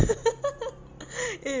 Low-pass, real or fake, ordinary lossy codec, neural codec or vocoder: 7.2 kHz; real; Opus, 32 kbps; none